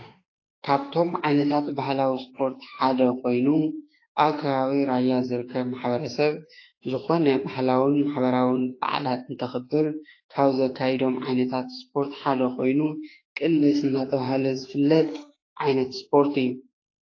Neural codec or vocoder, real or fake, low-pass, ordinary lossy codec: autoencoder, 48 kHz, 32 numbers a frame, DAC-VAE, trained on Japanese speech; fake; 7.2 kHz; AAC, 32 kbps